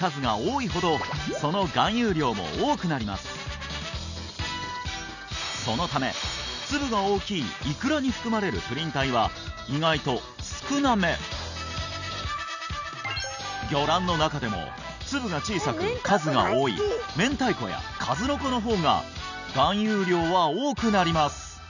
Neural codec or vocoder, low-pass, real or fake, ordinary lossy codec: none; 7.2 kHz; real; none